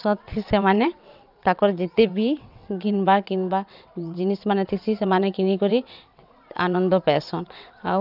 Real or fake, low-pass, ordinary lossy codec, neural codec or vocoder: fake; 5.4 kHz; none; vocoder, 22.05 kHz, 80 mel bands, WaveNeXt